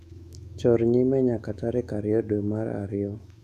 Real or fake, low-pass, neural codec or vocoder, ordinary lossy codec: fake; 14.4 kHz; autoencoder, 48 kHz, 128 numbers a frame, DAC-VAE, trained on Japanese speech; none